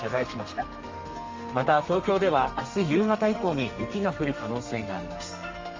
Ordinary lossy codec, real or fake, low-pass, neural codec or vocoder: Opus, 32 kbps; fake; 7.2 kHz; codec, 32 kHz, 1.9 kbps, SNAC